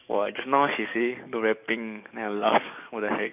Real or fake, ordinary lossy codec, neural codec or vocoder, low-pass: fake; none; codec, 44.1 kHz, 7.8 kbps, DAC; 3.6 kHz